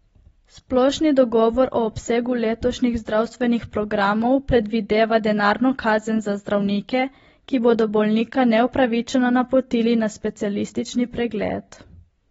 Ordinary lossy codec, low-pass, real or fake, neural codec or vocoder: AAC, 24 kbps; 19.8 kHz; real; none